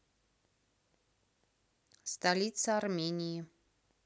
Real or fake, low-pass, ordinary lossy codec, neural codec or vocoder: real; none; none; none